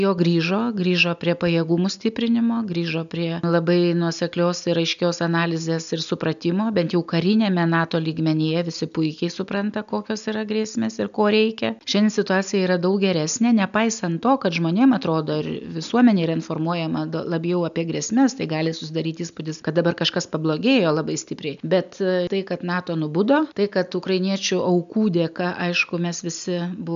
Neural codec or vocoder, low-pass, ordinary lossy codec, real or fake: none; 7.2 kHz; MP3, 96 kbps; real